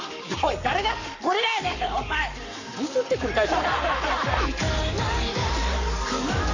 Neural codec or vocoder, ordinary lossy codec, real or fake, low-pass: codec, 44.1 kHz, 7.8 kbps, Pupu-Codec; none; fake; 7.2 kHz